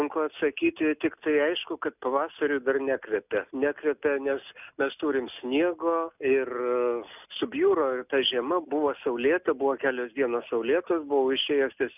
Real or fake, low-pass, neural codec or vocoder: real; 3.6 kHz; none